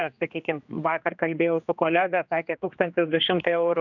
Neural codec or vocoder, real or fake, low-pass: codec, 16 kHz, 2 kbps, X-Codec, HuBERT features, trained on general audio; fake; 7.2 kHz